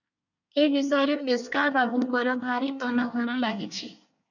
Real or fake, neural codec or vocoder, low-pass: fake; codec, 24 kHz, 1 kbps, SNAC; 7.2 kHz